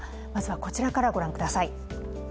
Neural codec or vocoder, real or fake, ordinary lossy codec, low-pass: none; real; none; none